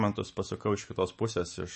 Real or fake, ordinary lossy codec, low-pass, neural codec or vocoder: fake; MP3, 32 kbps; 10.8 kHz; autoencoder, 48 kHz, 128 numbers a frame, DAC-VAE, trained on Japanese speech